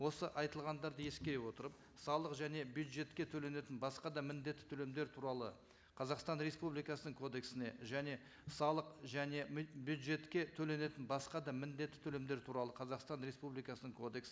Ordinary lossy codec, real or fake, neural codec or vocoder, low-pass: none; real; none; none